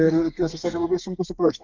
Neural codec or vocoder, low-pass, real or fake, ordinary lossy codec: codec, 32 kHz, 1.9 kbps, SNAC; 7.2 kHz; fake; Opus, 32 kbps